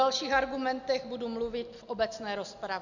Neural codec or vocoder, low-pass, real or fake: none; 7.2 kHz; real